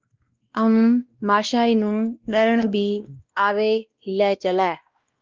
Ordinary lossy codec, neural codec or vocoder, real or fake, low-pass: Opus, 32 kbps; codec, 16 kHz, 1 kbps, X-Codec, WavLM features, trained on Multilingual LibriSpeech; fake; 7.2 kHz